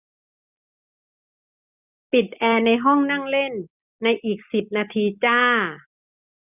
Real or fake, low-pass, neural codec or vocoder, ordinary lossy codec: real; 3.6 kHz; none; none